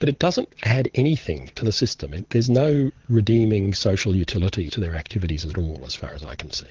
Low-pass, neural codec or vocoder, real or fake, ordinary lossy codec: 7.2 kHz; vocoder, 22.05 kHz, 80 mel bands, Vocos; fake; Opus, 16 kbps